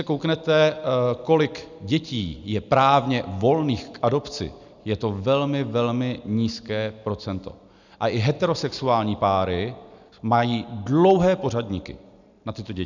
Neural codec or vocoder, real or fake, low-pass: none; real; 7.2 kHz